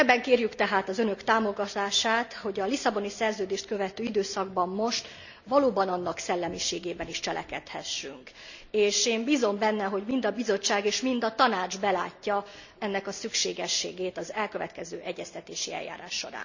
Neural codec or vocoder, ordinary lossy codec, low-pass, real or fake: none; none; 7.2 kHz; real